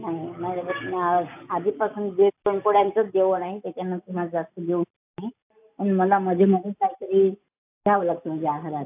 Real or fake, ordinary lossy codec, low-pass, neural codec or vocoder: real; AAC, 32 kbps; 3.6 kHz; none